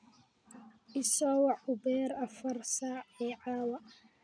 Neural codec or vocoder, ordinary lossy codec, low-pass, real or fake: none; none; 9.9 kHz; real